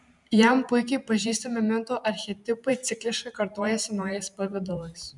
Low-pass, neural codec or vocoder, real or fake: 10.8 kHz; vocoder, 44.1 kHz, 128 mel bands every 512 samples, BigVGAN v2; fake